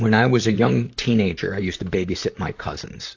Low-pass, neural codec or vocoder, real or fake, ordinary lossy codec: 7.2 kHz; vocoder, 44.1 kHz, 128 mel bands, Pupu-Vocoder; fake; AAC, 48 kbps